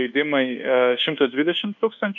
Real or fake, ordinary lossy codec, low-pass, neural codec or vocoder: fake; MP3, 64 kbps; 7.2 kHz; codec, 24 kHz, 1.2 kbps, DualCodec